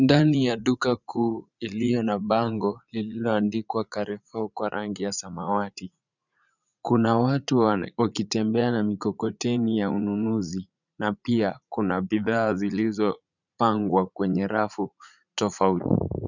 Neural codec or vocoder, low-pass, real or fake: vocoder, 44.1 kHz, 80 mel bands, Vocos; 7.2 kHz; fake